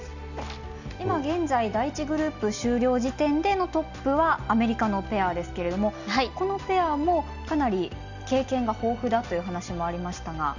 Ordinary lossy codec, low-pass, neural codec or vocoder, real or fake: none; 7.2 kHz; none; real